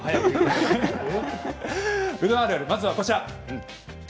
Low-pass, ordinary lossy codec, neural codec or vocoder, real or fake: none; none; none; real